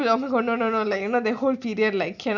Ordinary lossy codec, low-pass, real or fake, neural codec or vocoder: none; 7.2 kHz; real; none